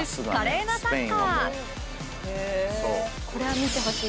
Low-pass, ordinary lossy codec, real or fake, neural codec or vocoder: none; none; real; none